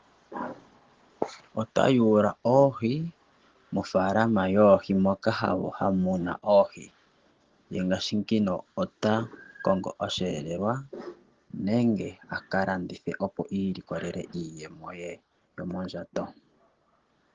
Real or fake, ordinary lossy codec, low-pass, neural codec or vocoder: real; Opus, 16 kbps; 7.2 kHz; none